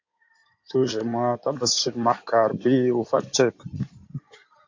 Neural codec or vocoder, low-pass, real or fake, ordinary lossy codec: codec, 16 kHz in and 24 kHz out, 2.2 kbps, FireRedTTS-2 codec; 7.2 kHz; fake; MP3, 48 kbps